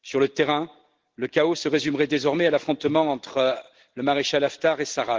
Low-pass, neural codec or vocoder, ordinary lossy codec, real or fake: 7.2 kHz; none; Opus, 16 kbps; real